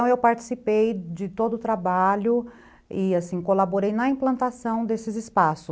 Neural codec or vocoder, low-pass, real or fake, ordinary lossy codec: none; none; real; none